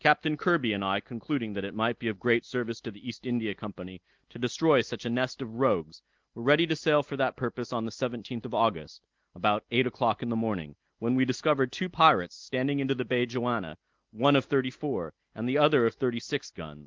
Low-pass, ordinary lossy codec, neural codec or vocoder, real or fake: 7.2 kHz; Opus, 32 kbps; none; real